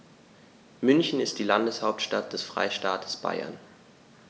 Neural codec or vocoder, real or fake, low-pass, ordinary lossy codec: none; real; none; none